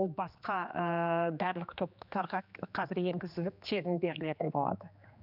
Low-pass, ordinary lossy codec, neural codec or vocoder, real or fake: 5.4 kHz; none; codec, 16 kHz, 2 kbps, X-Codec, HuBERT features, trained on general audio; fake